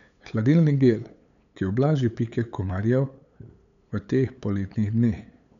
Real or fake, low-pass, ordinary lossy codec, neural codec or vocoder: fake; 7.2 kHz; none; codec, 16 kHz, 8 kbps, FunCodec, trained on LibriTTS, 25 frames a second